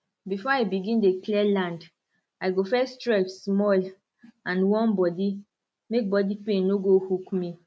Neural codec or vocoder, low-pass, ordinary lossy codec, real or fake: none; none; none; real